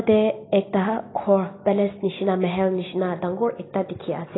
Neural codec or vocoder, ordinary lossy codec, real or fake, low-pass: none; AAC, 16 kbps; real; 7.2 kHz